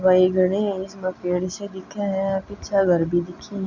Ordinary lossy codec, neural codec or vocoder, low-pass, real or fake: Opus, 64 kbps; none; 7.2 kHz; real